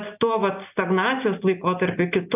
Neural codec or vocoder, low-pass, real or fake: none; 3.6 kHz; real